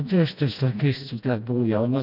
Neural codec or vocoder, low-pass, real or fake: codec, 16 kHz, 1 kbps, FreqCodec, smaller model; 5.4 kHz; fake